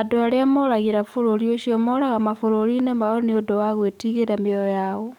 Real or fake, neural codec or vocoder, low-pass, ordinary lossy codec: fake; codec, 44.1 kHz, 7.8 kbps, DAC; 19.8 kHz; none